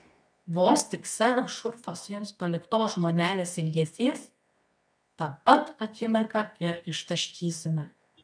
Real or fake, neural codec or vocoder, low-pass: fake; codec, 24 kHz, 0.9 kbps, WavTokenizer, medium music audio release; 9.9 kHz